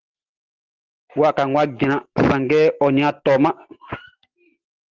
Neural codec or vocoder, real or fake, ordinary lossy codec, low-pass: none; real; Opus, 16 kbps; 7.2 kHz